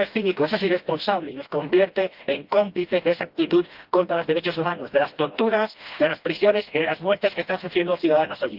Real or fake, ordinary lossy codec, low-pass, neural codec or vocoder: fake; Opus, 32 kbps; 5.4 kHz; codec, 16 kHz, 1 kbps, FreqCodec, smaller model